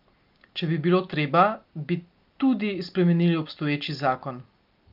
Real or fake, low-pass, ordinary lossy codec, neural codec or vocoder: real; 5.4 kHz; Opus, 24 kbps; none